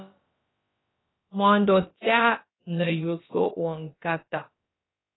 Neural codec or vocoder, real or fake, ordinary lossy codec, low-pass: codec, 16 kHz, about 1 kbps, DyCAST, with the encoder's durations; fake; AAC, 16 kbps; 7.2 kHz